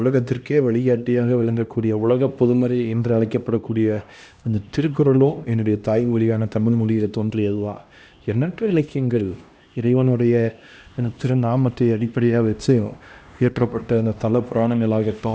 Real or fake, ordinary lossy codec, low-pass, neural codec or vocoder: fake; none; none; codec, 16 kHz, 1 kbps, X-Codec, HuBERT features, trained on LibriSpeech